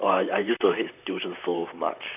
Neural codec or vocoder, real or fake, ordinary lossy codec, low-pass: vocoder, 44.1 kHz, 128 mel bands, Pupu-Vocoder; fake; none; 3.6 kHz